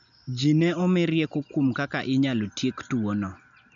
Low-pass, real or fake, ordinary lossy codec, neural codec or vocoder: 7.2 kHz; real; none; none